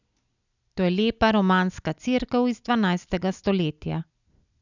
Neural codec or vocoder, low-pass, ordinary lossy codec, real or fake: none; 7.2 kHz; none; real